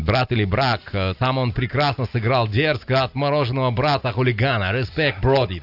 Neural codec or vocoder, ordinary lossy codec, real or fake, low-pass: none; AAC, 48 kbps; real; 5.4 kHz